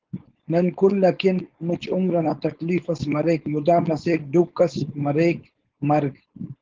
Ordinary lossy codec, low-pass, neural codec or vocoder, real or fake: Opus, 16 kbps; 7.2 kHz; codec, 16 kHz, 4.8 kbps, FACodec; fake